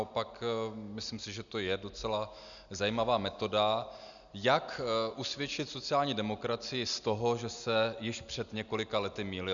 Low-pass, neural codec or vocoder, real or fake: 7.2 kHz; none; real